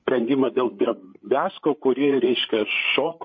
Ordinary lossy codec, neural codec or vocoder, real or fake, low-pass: MP3, 32 kbps; codec, 16 kHz, 8 kbps, FreqCodec, larger model; fake; 7.2 kHz